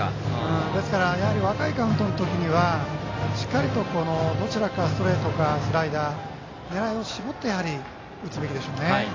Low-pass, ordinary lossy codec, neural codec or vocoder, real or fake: 7.2 kHz; AAC, 32 kbps; none; real